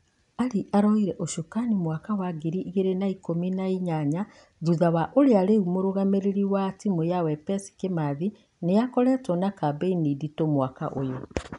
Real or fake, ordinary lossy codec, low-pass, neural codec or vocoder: real; none; 10.8 kHz; none